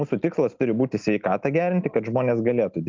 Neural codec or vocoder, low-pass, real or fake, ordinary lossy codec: none; 7.2 kHz; real; Opus, 32 kbps